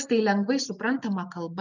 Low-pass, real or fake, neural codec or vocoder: 7.2 kHz; real; none